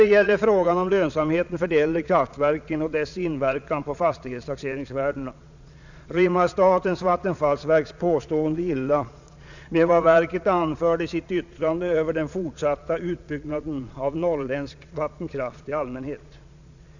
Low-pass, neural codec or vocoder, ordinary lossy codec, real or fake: 7.2 kHz; vocoder, 22.05 kHz, 80 mel bands, Vocos; none; fake